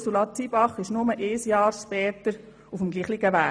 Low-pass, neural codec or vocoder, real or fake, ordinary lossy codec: none; none; real; none